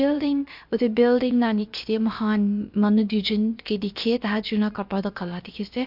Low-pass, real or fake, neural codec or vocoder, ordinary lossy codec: 5.4 kHz; fake; codec, 16 kHz, about 1 kbps, DyCAST, with the encoder's durations; MP3, 48 kbps